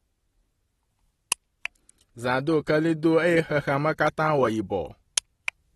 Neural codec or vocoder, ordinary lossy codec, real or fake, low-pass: none; AAC, 32 kbps; real; 19.8 kHz